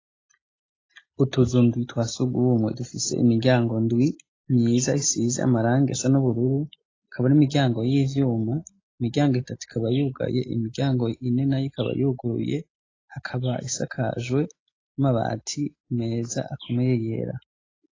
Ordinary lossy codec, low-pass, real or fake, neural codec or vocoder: AAC, 32 kbps; 7.2 kHz; real; none